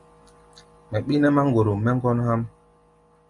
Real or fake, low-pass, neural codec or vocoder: real; 10.8 kHz; none